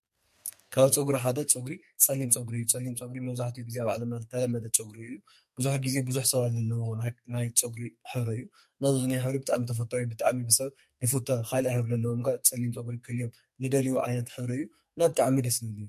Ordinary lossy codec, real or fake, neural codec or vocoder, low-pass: MP3, 64 kbps; fake; codec, 44.1 kHz, 2.6 kbps, SNAC; 14.4 kHz